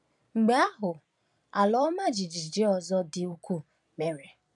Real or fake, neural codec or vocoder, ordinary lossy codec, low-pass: fake; vocoder, 44.1 kHz, 128 mel bands every 256 samples, BigVGAN v2; none; 10.8 kHz